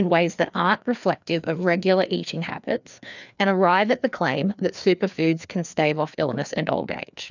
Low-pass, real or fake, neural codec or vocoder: 7.2 kHz; fake; codec, 16 kHz, 2 kbps, FreqCodec, larger model